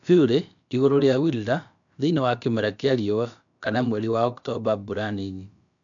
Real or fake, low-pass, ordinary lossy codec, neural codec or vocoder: fake; 7.2 kHz; none; codec, 16 kHz, about 1 kbps, DyCAST, with the encoder's durations